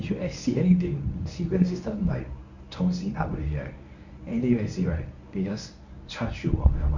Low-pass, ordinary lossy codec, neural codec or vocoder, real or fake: 7.2 kHz; none; codec, 24 kHz, 0.9 kbps, WavTokenizer, medium speech release version 1; fake